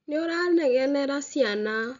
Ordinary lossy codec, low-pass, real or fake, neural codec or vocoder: none; 7.2 kHz; real; none